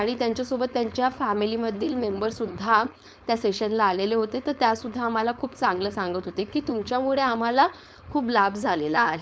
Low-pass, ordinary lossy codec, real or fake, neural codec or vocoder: none; none; fake; codec, 16 kHz, 4.8 kbps, FACodec